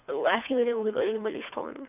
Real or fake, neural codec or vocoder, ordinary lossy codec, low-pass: fake; codec, 24 kHz, 3 kbps, HILCodec; none; 3.6 kHz